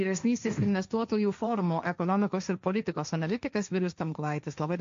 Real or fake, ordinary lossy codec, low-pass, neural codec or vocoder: fake; AAC, 64 kbps; 7.2 kHz; codec, 16 kHz, 1.1 kbps, Voila-Tokenizer